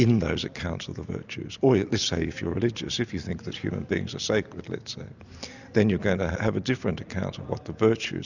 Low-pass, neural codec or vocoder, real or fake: 7.2 kHz; none; real